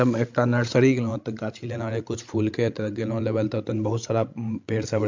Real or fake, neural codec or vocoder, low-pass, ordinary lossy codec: fake; codec, 16 kHz, 16 kbps, FreqCodec, larger model; 7.2 kHz; MP3, 48 kbps